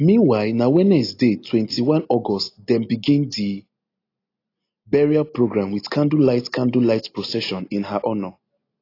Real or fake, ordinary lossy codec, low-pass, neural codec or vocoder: real; AAC, 32 kbps; 5.4 kHz; none